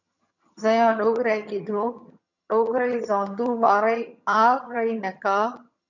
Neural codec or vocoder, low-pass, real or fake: vocoder, 22.05 kHz, 80 mel bands, HiFi-GAN; 7.2 kHz; fake